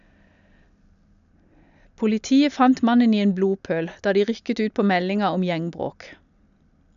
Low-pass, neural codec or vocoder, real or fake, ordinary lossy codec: 7.2 kHz; none; real; none